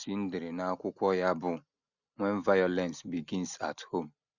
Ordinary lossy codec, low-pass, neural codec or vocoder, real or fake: none; 7.2 kHz; none; real